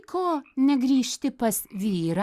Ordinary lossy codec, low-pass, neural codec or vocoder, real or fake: Opus, 64 kbps; 14.4 kHz; none; real